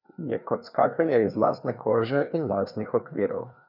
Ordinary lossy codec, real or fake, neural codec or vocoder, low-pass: none; fake; codec, 16 kHz, 2 kbps, FreqCodec, larger model; 5.4 kHz